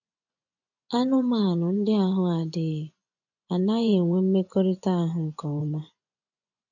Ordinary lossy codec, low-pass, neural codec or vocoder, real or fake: none; 7.2 kHz; vocoder, 24 kHz, 100 mel bands, Vocos; fake